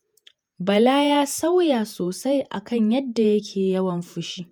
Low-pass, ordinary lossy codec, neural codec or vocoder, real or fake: none; none; vocoder, 48 kHz, 128 mel bands, Vocos; fake